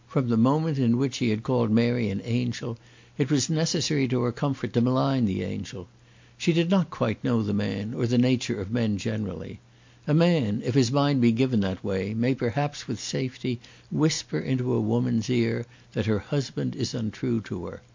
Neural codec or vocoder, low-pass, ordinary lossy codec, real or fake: none; 7.2 kHz; MP3, 48 kbps; real